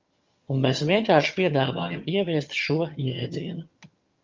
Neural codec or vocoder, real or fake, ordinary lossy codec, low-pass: vocoder, 22.05 kHz, 80 mel bands, HiFi-GAN; fake; Opus, 32 kbps; 7.2 kHz